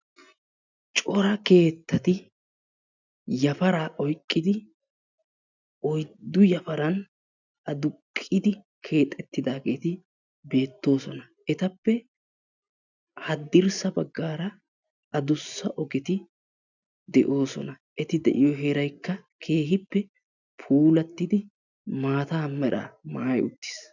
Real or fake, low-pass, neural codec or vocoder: real; 7.2 kHz; none